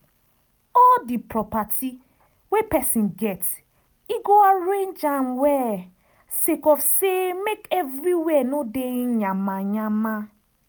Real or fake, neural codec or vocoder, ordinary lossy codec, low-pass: real; none; none; none